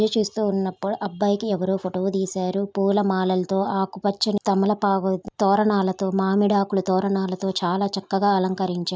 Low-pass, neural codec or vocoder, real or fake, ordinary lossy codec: none; none; real; none